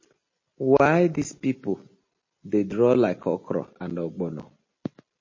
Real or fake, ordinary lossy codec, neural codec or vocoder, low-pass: real; MP3, 32 kbps; none; 7.2 kHz